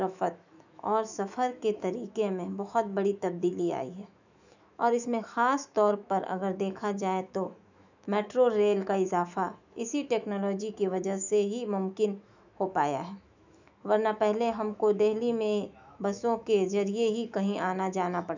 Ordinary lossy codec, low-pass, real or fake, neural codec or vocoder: none; 7.2 kHz; fake; autoencoder, 48 kHz, 128 numbers a frame, DAC-VAE, trained on Japanese speech